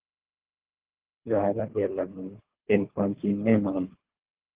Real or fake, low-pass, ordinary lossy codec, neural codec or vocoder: fake; 3.6 kHz; Opus, 16 kbps; codec, 24 kHz, 3 kbps, HILCodec